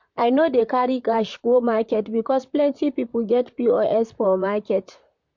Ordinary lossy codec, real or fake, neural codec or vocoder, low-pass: MP3, 48 kbps; fake; vocoder, 44.1 kHz, 128 mel bands, Pupu-Vocoder; 7.2 kHz